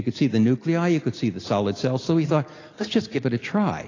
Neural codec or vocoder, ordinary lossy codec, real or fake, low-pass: none; AAC, 32 kbps; real; 7.2 kHz